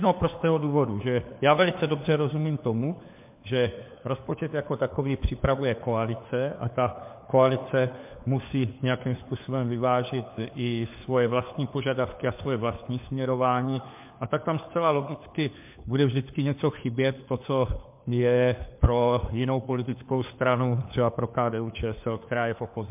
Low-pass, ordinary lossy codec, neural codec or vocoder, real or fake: 3.6 kHz; MP3, 32 kbps; codec, 16 kHz, 4 kbps, FunCodec, trained on LibriTTS, 50 frames a second; fake